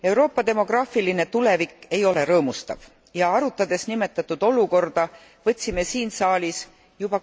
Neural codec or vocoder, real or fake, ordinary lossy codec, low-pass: none; real; none; none